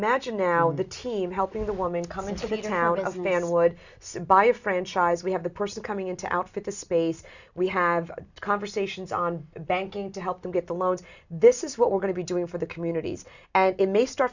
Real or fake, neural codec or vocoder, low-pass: real; none; 7.2 kHz